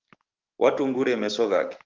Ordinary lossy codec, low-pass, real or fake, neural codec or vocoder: Opus, 32 kbps; 7.2 kHz; fake; codec, 44.1 kHz, 7.8 kbps, DAC